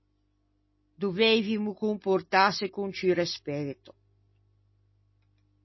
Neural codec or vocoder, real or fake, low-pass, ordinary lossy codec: none; real; 7.2 kHz; MP3, 24 kbps